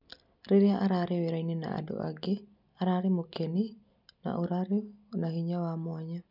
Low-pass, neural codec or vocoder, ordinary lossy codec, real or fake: 5.4 kHz; none; none; real